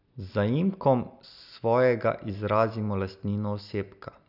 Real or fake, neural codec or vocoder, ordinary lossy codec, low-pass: real; none; none; 5.4 kHz